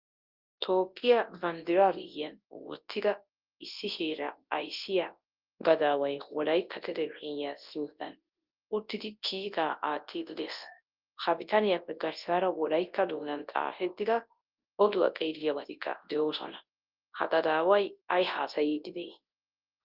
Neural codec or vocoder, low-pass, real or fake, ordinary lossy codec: codec, 24 kHz, 0.9 kbps, WavTokenizer, large speech release; 5.4 kHz; fake; Opus, 32 kbps